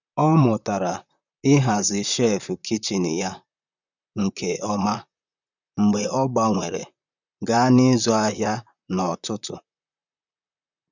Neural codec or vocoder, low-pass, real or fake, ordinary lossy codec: vocoder, 44.1 kHz, 128 mel bands, Pupu-Vocoder; 7.2 kHz; fake; none